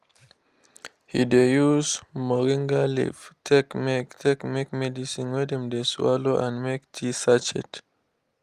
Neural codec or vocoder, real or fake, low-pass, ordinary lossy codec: none; real; 14.4 kHz; Opus, 32 kbps